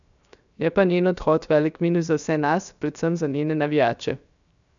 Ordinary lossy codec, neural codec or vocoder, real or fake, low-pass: none; codec, 16 kHz, 0.7 kbps, FocalCodec; fake; 7.2 kHz